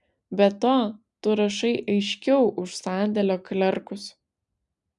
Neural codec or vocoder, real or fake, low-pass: none; real; 10.8 kHz